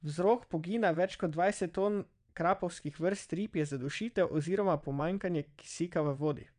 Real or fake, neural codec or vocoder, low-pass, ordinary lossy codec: fake; vocoder, 22.05 kHz, 80 mel bands, WaveNeXt; 9.9 kHz; none